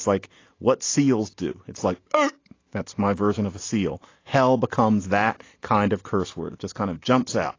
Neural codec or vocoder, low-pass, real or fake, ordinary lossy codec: none; 7.2 kHz; real; AAC, 32 kbps